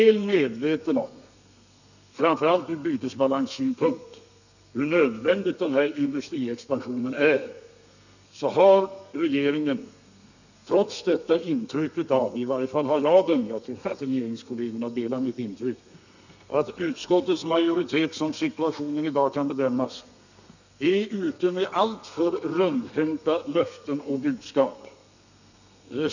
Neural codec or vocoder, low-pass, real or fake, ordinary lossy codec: codec, 32 kHz, 1.9 kbps, SNAC; 7.2 kHz; fake; none